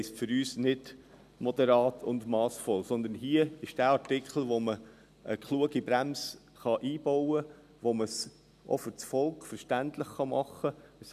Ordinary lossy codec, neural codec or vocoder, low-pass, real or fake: none; none; 14.4 kHz; real